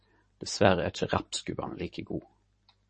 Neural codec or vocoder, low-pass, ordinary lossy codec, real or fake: vocoder, 44.1 kHz, 128 mel bands every 512 samples, BigVGAN v2; 10.8 kHz; MP3, 32 kbps; fake